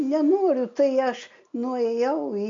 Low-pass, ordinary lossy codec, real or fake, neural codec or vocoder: 7.2 kHz; MP3, 48 kbps; real; none